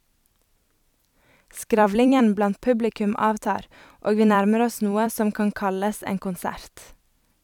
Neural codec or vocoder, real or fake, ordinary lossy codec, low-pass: vocoder, 44.1 kHz, 128 mel bands every 256 samples, BigVGAN v2; fake; none; 19.8 kHz